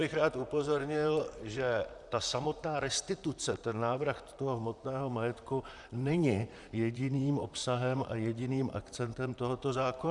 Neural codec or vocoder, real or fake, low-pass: vocoder, 44.1 kHz, 128 mel bands, Pupu-Vocoder; fake; 10.8 kHz